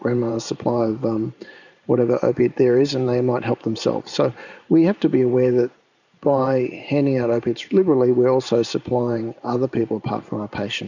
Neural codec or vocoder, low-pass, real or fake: vocoder, 44.1 kHz, 128 mel bands every 512 samples, BigVGAN v2; 7.2 kHz; fake